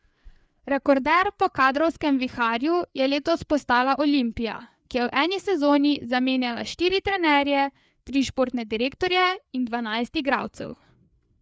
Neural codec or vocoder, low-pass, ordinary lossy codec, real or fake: codec, 16 kHz, 4 kbps, FreqCodec, larger model; none; none; fake